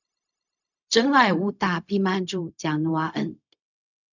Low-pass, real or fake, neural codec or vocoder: 7.2 kHz; fake; codec, 16 kHz, 0.4 kbps, LongCat-Audio-Codec